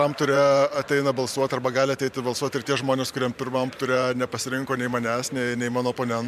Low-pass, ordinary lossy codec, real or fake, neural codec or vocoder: 14.4 kHz; MP3, 96 kbps; fake; vocoder, 44.1 kHz, 128 mel bands every 512 samples, BigVGAN v2